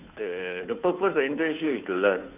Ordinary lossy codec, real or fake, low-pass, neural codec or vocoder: none; fake; 3.6 kHz; codec, 16 kHz, 2 kbps, FunCodec, trained on Chinese and English, 25 frames a second